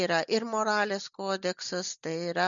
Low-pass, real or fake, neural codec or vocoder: 7.2 kHz; real; none